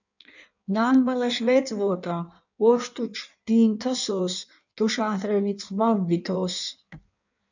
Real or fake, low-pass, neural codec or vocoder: fake; 7.2 kHz; codec, 16 kHz in and 24 kHz out, 1.1 kbps, FireRedTTS-2 codec